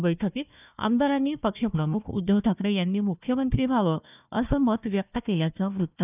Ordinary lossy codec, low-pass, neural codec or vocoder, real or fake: none; 3.6 kHz; codec, 16 kHz, 1 kbps, FunCodec, trained on Chinese and English, 50 frames a second; fake